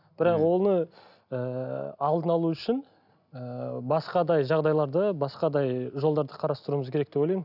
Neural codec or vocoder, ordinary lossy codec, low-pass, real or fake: none; none; 5.4 kHz; real